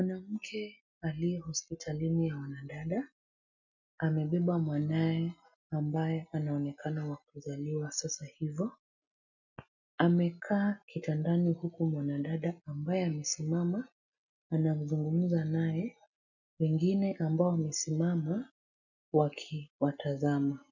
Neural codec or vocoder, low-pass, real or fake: none; 7.2 kHz; real